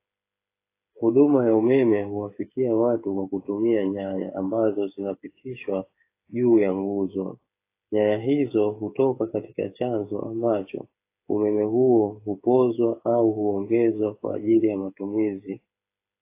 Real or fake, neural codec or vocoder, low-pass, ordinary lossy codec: fake; codec, 16 kHz, 8 kbps, FreqCodec, smaller model; 3.6 kHz; AAC, 24 kbps